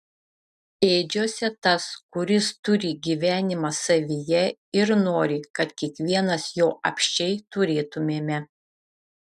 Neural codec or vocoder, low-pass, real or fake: none; 14.4 kHz; real